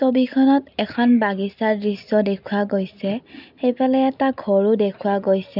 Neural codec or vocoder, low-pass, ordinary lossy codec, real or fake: none; 5.4 kHz; none; real